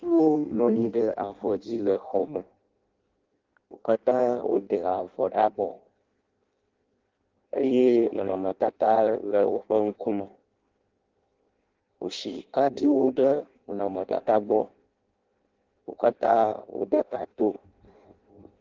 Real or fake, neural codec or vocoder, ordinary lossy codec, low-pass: fake; codec, 16 kHz in and 24 kHz out, 0.6 kbps, FireRedTTS-2 codec; Opus, 16 kbps; 7.2 kHz